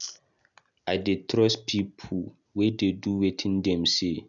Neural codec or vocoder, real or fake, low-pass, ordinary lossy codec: none; real; 7.2 kHz; none